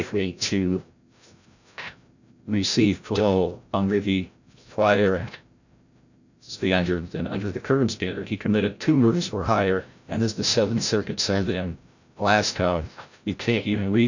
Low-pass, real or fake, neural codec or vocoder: 7.2 kHz; fake; codec, 16 kHz, 0.5 kbps, FreqCodec, larger model